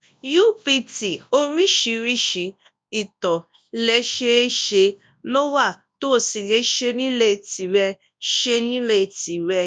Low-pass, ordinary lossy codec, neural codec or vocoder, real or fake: 9.9 kHz; none; codec, 24 kHz, 0.9 kbps, WavTokenizer, large speech release; fake